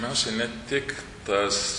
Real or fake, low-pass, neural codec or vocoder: real; 10.8 kHz; none